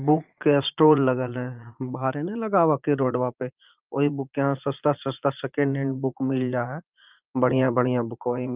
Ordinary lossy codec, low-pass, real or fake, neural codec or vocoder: Opus, 24 kbps; 3.6 kHz; fake; vocoder, 44.1 kHz, 80 mel bands, Vocos